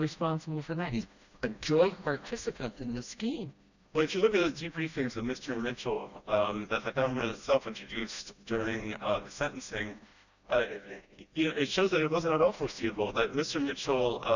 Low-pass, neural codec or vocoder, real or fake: 7.2 kHz; codec, 16 kHz, 1 kbps, FreqCodec, smaller model; fake